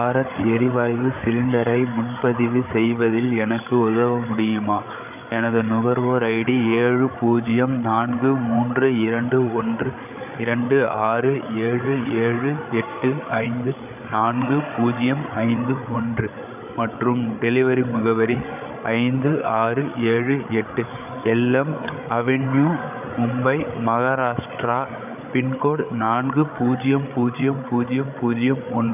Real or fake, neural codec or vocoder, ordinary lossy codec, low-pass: fake; codec, 16 kHz, 8 kbps, FreqCodec, larger model; none; 3.6 kHz